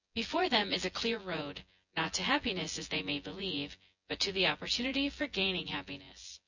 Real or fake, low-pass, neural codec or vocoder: fake; 7.2 kHz; vocoder, 24 kHz, 100 mel bands, Vocos